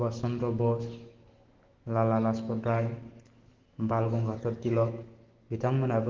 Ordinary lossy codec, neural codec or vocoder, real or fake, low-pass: Opus, 16 kbps; autoencoder, 48 kHz, 128 numbers a frame, DAC-VAE, trained on Japanese speech; fake; 7.2 kHz